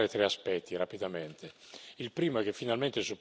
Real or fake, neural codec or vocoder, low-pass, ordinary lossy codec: real; none; none; none